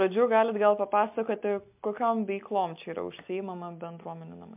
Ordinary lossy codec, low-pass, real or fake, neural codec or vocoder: MP3, 32 kbps; 3.6 kHz; real; none